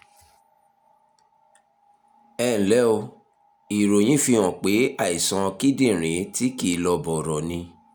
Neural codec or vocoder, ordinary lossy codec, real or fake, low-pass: none; none; real; none